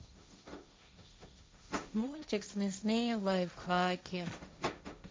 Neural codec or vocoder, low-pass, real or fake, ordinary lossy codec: codec, 16 kHz, 1.1 kbps, Voila-Tokenizer; none; fake; none